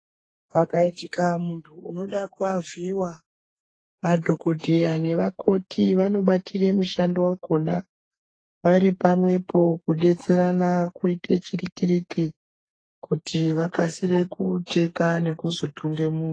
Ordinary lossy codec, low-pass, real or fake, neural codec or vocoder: AAC, 32 kbps; 9.9 kHz; fake; codec, 32 kHz, 1.9 kbps, SNAC